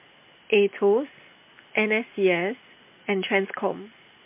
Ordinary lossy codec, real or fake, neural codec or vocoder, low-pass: MP3, 24 kbps; real; none; 3.6 kHz